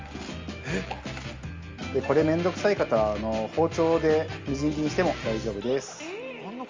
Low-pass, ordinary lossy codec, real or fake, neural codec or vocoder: 7.2 kHz; Opus, 32 kbps; real; none